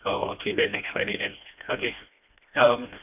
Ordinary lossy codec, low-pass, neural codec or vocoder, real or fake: none; 3.6 kHz; codec, 16 kHz, 1 kbps, FreqCodec, smaller model; fake